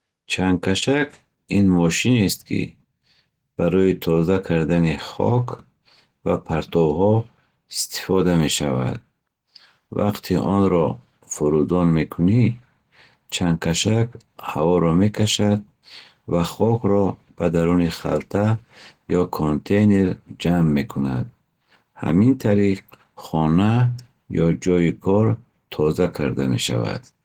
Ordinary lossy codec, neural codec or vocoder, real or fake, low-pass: Opus, 16 kbps; none; real; 19.8 kHz